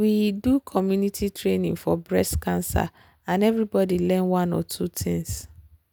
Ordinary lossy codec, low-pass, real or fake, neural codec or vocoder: none; none; real; none